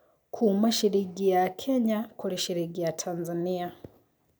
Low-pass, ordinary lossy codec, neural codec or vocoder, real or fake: none; none; none; real